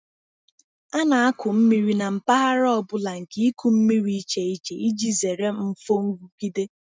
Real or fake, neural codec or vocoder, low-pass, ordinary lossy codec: real; none; none; none